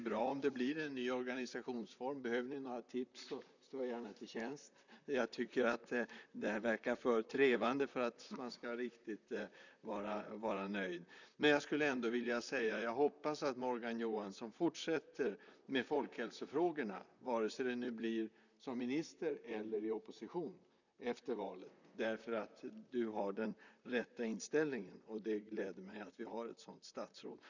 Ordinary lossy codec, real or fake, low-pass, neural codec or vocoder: none; fake; 7.2 kHz; vocoder, 44.1 kHz, 128 mel bands, Pupu-Vocoder